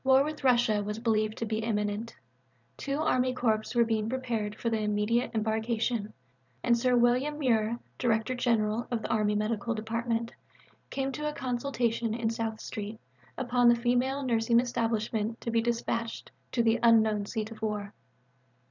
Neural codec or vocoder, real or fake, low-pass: vocoder, 44.1 kHz, 128 mel bands every 512 samples, BigVGAN v2; fake; 7.2 kHz